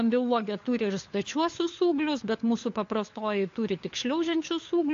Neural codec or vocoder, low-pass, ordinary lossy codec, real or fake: codec, 16 kHz, 4 kbps, FunCodec, trained on LibriTTS, 50 frames a second; 7.2 kHz; AAC, 48 kbps; fake